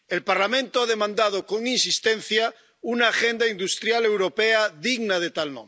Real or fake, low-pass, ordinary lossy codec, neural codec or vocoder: real; none; none; none